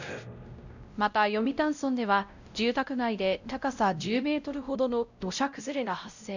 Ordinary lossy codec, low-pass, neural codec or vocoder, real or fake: none; 7.2 kHz; codec, 16 kHz, 0.5 kbps, X-Codec, WavLM features, trained on Multilingual LibriSpeech; fake